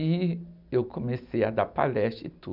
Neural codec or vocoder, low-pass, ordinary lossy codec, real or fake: none; 5.4 kHz; none; real